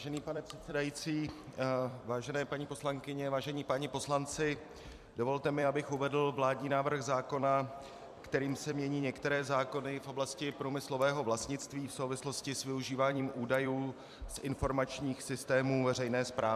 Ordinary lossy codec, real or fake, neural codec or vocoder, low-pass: MP3, 96 kbps; fake; vocoder, 44.1 kHz, 128 mel bands every 256 samples, BigVGAN v2; 14.4 kHz